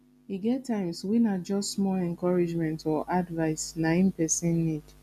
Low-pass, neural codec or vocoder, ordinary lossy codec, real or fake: 14.4 kHz; none; none; real